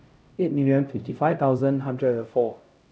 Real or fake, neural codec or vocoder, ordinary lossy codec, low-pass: fake; codec, 16 kHz, 0.5 kbps, X-Codec, HuBERT features, trained on LibriSpeech; none; none